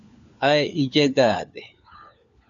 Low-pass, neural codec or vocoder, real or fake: 7.2 kHz; codec, 16 kHz, 4 kbps, FunCodec, trained on LibriTTS, 50 frames a second; fake